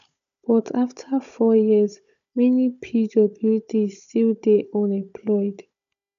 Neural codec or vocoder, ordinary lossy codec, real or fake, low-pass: codec, 16 kHz, 16 kbps, FunCodec, trained on Chinese and English, 50 frames a second; none; fake; 7.2 kHz